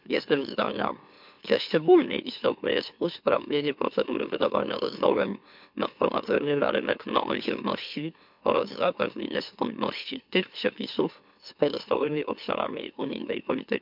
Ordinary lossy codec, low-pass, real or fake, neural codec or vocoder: MP3, 48 kbps; 5.4 kHz; fake; autoencoder, 44.1 kHz, a latent of 192 numbers a frame, MeloTTS